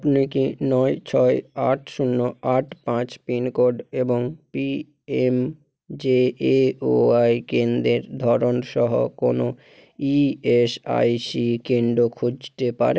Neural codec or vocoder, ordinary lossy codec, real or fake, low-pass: none; none; real; none